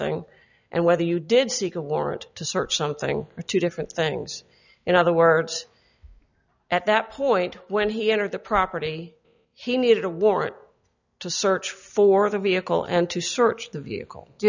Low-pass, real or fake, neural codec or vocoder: 7.2 kHz; real; none